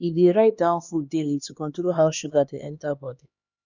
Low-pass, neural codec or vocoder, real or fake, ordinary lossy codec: 7.2 kHz; codec, 16 kHz, 2 kbps, X-Codec, HuBERT features, trained on LibriSpeech; fake; none